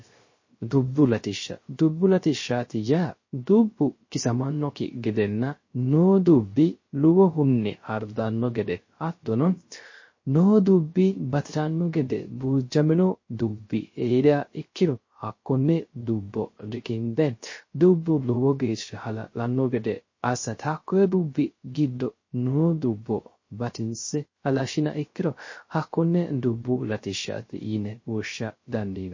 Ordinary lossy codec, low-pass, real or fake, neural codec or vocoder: MP3, 32 kbps; 7.2 kHz; fake; codec, 16 kHz, 0.3 kbps, FocalCodec